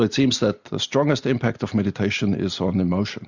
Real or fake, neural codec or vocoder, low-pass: real; none; 7.2 kHz